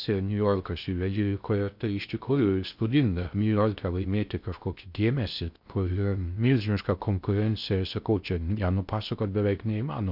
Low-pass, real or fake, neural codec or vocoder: 5.4 kHz; fake; codec, 16 kHz in and 24 kHz out, 0.6 kbps, FocalCodec, streaming, 2048 codes